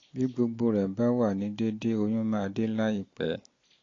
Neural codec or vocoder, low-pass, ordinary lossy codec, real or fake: none; 7.2 kHz; AAC, 32 kbps; real